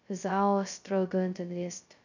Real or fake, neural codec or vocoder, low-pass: fake; codec, 16 kHz, 0.2 kbps, FocalCodec; 7.2 kHz